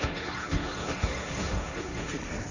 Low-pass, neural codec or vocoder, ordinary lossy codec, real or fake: 7.2 kHz; codec, 16 kHz, 1.1 kbps, Voila-Tokenizer; none; fake